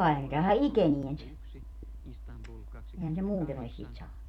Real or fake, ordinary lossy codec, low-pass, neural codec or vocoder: fake; none; 19.8 kHz; vocoder, 44.1 kHz, 128 mel bands every 256 samples, BigVGAN v2